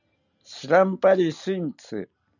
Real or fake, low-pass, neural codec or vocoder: real; 7.2 kHz; none